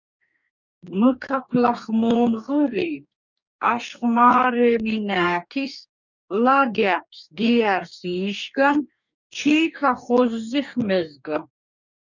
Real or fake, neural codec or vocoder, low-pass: fake; codec, 44.1 kHz, 2.6 kbps, DAC; 7.2 kHz